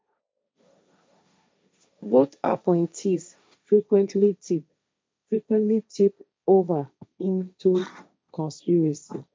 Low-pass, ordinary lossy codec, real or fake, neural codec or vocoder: none; none; fake; codec, 16 kHz, 1.1 kbps, Voila-Tokenizer